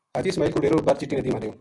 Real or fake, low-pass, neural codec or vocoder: real; 10.8 kHz; none